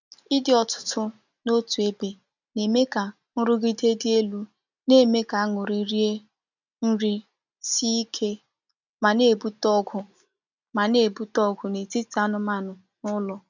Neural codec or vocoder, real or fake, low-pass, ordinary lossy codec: none; real; 7.2 kHz; none